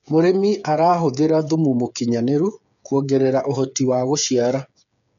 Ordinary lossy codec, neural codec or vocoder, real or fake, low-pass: none; codec, 16 kHz, 8 kbps, FreqCodec, smaller model; fake; 7.2 kHz